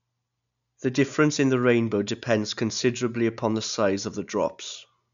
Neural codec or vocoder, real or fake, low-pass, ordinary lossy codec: none; real; 7.2 kHz; MP3, 96 kbps